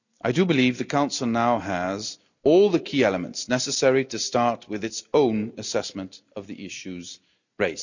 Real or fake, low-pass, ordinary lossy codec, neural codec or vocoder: real; 7.2 kHz; none; none